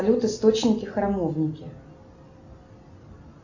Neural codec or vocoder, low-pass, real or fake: none; 7.2 kHz; real